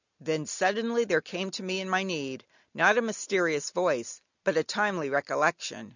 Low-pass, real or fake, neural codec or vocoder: 7.2 kHz; real; none